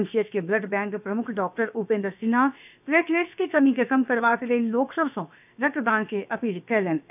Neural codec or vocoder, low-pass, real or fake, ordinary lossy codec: codec, 16 kHz, about 1 kbps, DyCAST, with the encoder's durations; 3.6 kHz; fake; none